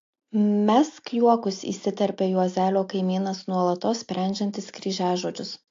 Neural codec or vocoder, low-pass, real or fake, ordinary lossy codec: none; 7.2 kHz; real; AAC, 48 kbps